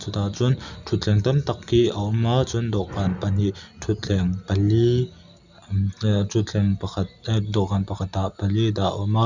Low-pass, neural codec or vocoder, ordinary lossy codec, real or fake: 7.2 kHz; none; none; real